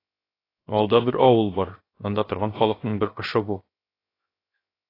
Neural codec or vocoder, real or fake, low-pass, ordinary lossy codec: codec, 16 kHz, 0.7 kbps, FocalCodec; fake; 5.4 kHz; AAC, 24 kbps